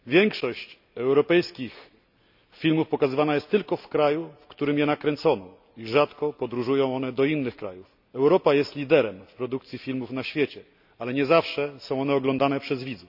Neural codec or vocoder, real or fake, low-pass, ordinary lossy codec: none; real; 5.4 kHz; none